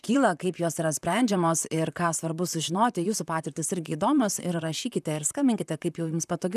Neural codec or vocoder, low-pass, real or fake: vocoder, 44.1 kHz, 128 mel bands, Pupu-Vocoder; 14.4 kHz; fake